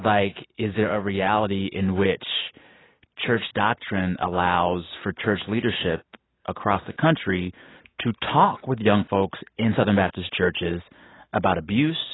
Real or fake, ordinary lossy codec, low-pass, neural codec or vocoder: real; AAC, 16 kbps; 7.2 kHz; none